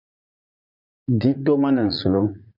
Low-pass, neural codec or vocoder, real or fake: 5.4 kHz; vocoder, 44.1 kHz, 80 mel bands, Vocos; fake